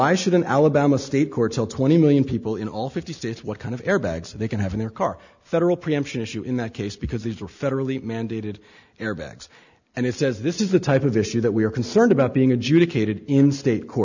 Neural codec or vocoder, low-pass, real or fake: none; 7.2 kHz; real